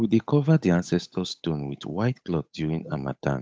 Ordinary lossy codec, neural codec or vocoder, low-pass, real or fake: none; codec, 16 kHz, 8 kbps, FunCodec, trained on Chinese and English, 25 frames a second; none; fake